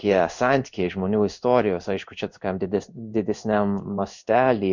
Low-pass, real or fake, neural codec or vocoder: 7.2 kHz; fake; codec, 16 kHz in and 24 kHz out, 1 kbps, XY-Tokenizer